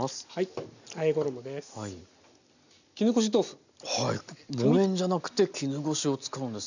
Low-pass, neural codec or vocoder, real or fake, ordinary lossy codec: 7.2 kHz; none; real; none